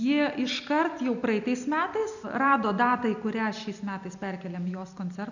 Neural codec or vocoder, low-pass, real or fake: none; 7.2 kHz; real